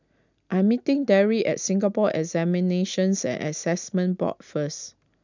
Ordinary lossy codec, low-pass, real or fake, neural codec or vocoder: none; 7.2 kHz; real; none